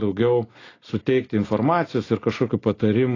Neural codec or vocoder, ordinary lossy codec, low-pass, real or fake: none; AAC, 32 kbps; 7.2 kHz; real